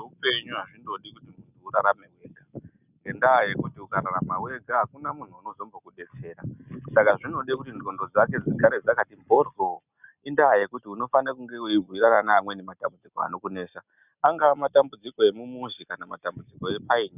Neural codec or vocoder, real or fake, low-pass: none; real; 3.6 kHz